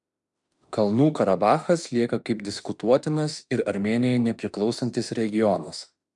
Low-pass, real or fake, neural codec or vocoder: 10.8 kHz; fake; autoencoder, 48 kHz, 32 numbers a frame, DAC-VAE, trained on Japanese speech